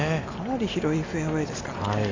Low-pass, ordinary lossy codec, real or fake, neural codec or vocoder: 7.2 kHz; AAC, 32 kbps; real; none